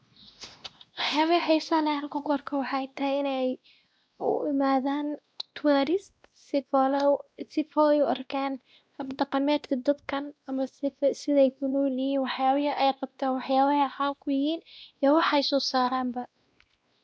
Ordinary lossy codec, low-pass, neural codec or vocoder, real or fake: none; none; codec, 16 kHz, 1 kbps, X-Codec, WavLM features, trained on Multilingual LibriSpeech; fake